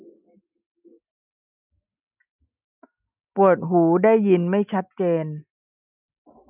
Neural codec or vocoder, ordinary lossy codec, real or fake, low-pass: none; none; real; 3.6 kHz